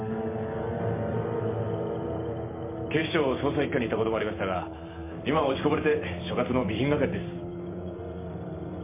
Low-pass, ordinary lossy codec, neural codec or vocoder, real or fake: 3.6 kHz; MP3, 24 kbps; vocoder, 44.1 kHz, 128 mel bands every 512 samples, BigVGAN v2; fake